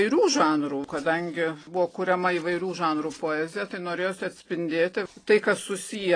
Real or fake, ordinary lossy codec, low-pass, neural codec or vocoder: real; AAC, 32 kbps; 9.9 kHz; none